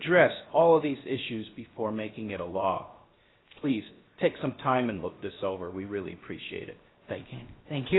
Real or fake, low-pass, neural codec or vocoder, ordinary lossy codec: fake; 7.2 kHz; codec, 16 kHz, 0.7 kbps, FocalCodec; AAC, 16 kbps